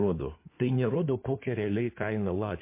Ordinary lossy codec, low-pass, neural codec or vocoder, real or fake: MP3, 24 kbps; 3.6 kHz; codec, 16 kHz in and 24 kHz out, 2.2 kbps, FireRedTTS-2 codec; fake